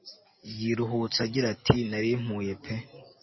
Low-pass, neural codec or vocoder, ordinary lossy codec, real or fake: 7.2 kHz; none; MP3, 24 kbps; real